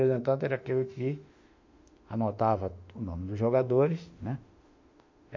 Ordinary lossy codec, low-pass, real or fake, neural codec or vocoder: MP3, 64 kbps; 7.2 kHz; fake; autoencoder, 48 kHz, 32 numbers a frame, DAC-VAE, trained on Japanese speech